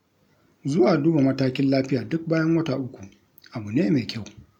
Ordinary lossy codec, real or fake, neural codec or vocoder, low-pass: none; real; none; 19.8 kHz